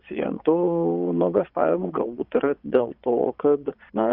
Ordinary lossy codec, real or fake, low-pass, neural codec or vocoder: Opus, 64 kbps; fake; 5.4 kHz; vocoder, 22.05 kHz, 80 mel bands, Vocos